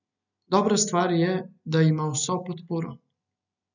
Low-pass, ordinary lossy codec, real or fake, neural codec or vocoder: 7.2 kHz; none; real; none